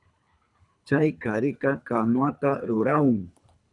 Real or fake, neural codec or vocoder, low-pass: fake; codec, 24 kHz, 3 kbps, HILCodec; 10.8 kHz